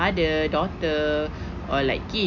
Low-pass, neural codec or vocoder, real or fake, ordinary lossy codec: 7.2 kHz; none; real; none